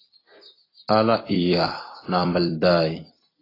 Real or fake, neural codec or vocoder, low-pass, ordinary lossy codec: fake; vocoder, 44.1 kHz, 128 mel bands every 256 samples, BigVGAN v2; 5.4 kHz; AAC, 24 kbps